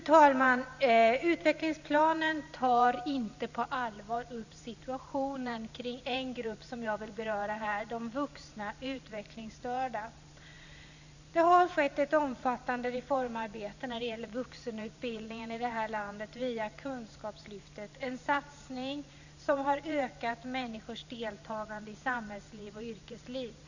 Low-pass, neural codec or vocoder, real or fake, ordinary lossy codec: 7.2 kHz; vocoder, 44.1 kHz, 128 mel bands every 512 samples, BigVGAN v2; fake; none